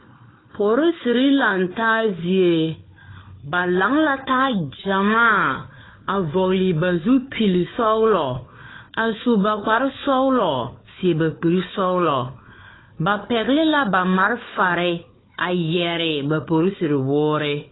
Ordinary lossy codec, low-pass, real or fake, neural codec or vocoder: AAC, 16 kbps; 7.2 kHz; fake; codec, 16 kHz, 4 kbps, FunCodec, trained on Chinese and English, 50 frames a second